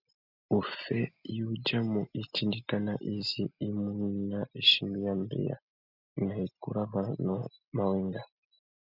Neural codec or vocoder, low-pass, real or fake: none; 5.4 kHz; real